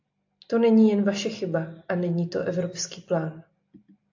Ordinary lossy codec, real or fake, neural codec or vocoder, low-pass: AAC, 48 kbps; real; none; 7.2 kHz